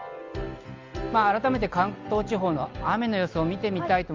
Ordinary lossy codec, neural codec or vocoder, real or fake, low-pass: Opus, 32 kbps; none; real; 7.2 kHz